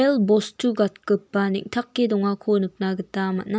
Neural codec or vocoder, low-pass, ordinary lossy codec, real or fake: none; none; none; real